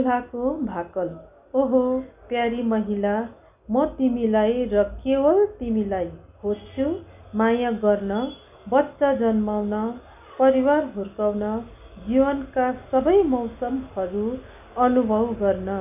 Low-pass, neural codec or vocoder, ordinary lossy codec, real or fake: 3.6 kHz; none; none; real